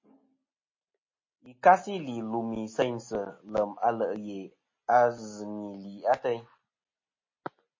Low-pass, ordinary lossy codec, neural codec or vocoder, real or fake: 7.2 kHz; MP3, 32 kbps; none; real